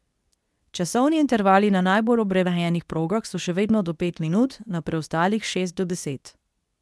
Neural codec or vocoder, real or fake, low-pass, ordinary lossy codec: codec, 24 kHz, 0.9 kbps, WavTokenizer, medium speech release version 2; fake; none; none